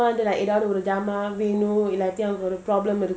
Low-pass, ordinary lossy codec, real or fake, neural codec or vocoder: none; none; real; none